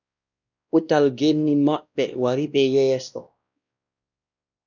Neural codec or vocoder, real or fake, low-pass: codec, 16 kHz, 1 kbps, X-Codec, WavLM features, trained on Multilingual LibriSpeech; fake; 7.2 kHz